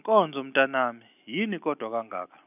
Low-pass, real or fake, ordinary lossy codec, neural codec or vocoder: 3.6 kHz; real; none; none